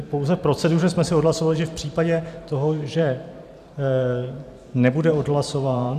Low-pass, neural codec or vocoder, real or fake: 14.4 kHz; vocoder, 44.1 kHz, 128 mel bands every 512 samples, BigVGAN v2; fake